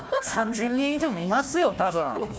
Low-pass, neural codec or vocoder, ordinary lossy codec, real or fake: none; codec, 16 kHz, 1 kbps, FunCodec, trained on Chinese and English, 50 frames a second; none; fake